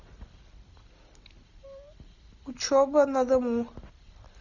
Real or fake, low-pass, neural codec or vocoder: real; 7.2 kHz; none